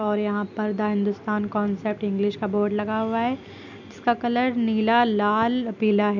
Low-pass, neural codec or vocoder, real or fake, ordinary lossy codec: 7.2 kHz; none; real; none